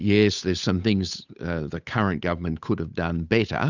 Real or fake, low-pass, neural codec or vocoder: fake; 7.2 kHz; codec, 16 kHz, 8 kbps, FunCodec, trained on Chinese and English, 25 frames a second